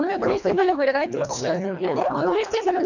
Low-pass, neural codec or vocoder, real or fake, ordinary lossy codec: 7.2 kHz; codec, 24 kHz, 1.5 kbps, HILCodec; fake; none